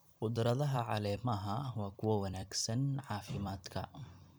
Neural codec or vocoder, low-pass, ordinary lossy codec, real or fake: vocoder, 44.1 kHz, 128 mel bands every 256 samples, BigVGAN v2; none; none; fake